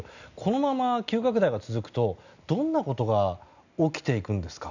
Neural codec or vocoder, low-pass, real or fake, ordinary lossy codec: none; 7.2 kHz; real; none